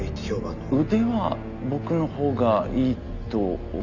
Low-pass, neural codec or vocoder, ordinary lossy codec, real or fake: 7.2 kHz; none; none; real